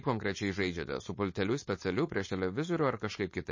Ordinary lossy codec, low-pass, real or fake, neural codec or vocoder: MP3, 32 kbps; 7.2 kHz; fake; codec, 16 kHz, 4.8 kbps, FACodec